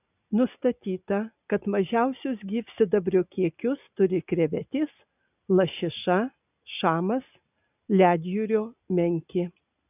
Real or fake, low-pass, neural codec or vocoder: real; 3.6 kHz; none